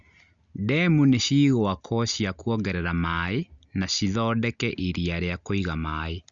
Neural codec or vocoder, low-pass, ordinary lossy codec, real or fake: none; 7.2 kHz; Opus, 64 kbps; real